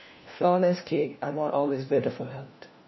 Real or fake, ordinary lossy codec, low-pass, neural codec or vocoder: fake; MP3, 24 kbps; 7.2 kHz; codec, 16 kHz, 1 kbps, FunCodec, trained on LibriTTS, 50 frames a second